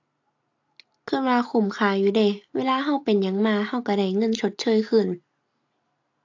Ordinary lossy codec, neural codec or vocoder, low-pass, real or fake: AAC, 48 kbps; none; 7.2 kHz; real